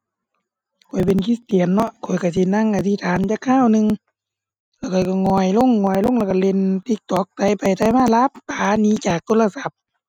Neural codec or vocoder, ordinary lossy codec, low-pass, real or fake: none; none; 19.8 kHz; real